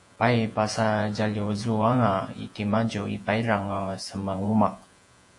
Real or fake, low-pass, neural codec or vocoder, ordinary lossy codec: fake; 10.8 kHz; vocoder, 48 kHz, 128 mel bands, Vocos; AAC, 64 kbps